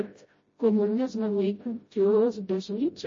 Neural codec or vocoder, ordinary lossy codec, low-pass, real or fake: codec, 16 kHz, 0.5 kbps, FreqCodec, smaller model; MP3, 32 kbps; 7.2 kHz; fake